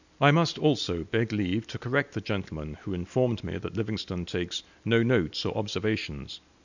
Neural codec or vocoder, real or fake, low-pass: none; real; 7.2 kHz